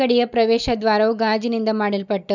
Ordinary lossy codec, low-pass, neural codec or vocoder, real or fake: none; 7.2 kHz; none; real